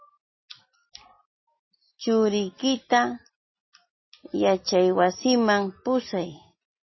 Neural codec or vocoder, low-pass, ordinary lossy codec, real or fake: none; 7.2 kHz; MP3, 24 kbps; real